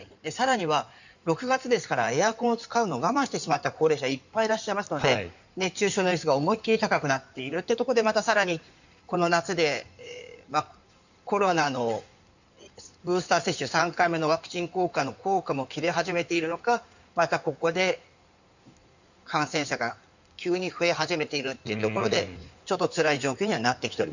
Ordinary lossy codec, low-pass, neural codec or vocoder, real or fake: none; 7.2 kHz; codec, 16 kHz in and 24 kHz out, 2.2 kbps, FireRedTTS-2 codec; fake